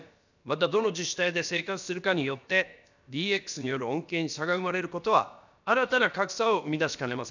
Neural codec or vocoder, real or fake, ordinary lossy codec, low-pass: codec, 16 kHz, about 1 kbps, DyCAST, with the encoder's durations; fake; none; 7.2 kHz